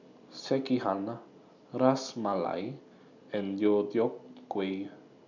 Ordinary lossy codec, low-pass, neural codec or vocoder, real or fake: none; 7.2 kHz; none; real